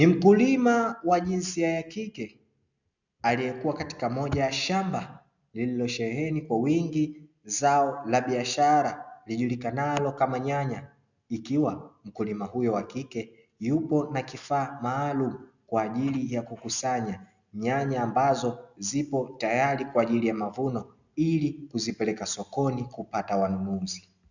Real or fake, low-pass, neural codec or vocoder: real; 7.2 kHz; none